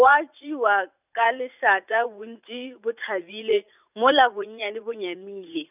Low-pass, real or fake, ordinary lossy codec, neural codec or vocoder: 3.6 kHz; fake; none; vocoder, 44.1 kHz, 80 mel bands, Vocos